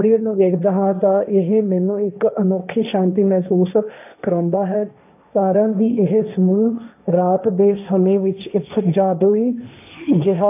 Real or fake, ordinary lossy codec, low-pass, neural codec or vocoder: fake; none; 3.6 kHz; codec, 16 kHz, 1.1 kbps, Voila-Tokenizer